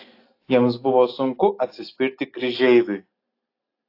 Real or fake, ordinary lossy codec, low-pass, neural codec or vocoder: real; AAC, 32 kbps; 5.4 kHz; none